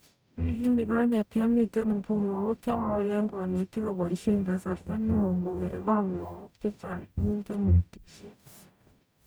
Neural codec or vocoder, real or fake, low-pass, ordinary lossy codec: codec, 44.1 kHz, 0.9 kbps, DAC; fake; none; none